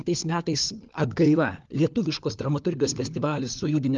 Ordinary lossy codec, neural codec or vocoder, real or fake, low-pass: Opus, 24 kbps; codec, 16 kHz, 4 kbps, FunCodec, trained on LibriTTS, 50 frames a second; fake; 7.2 kHz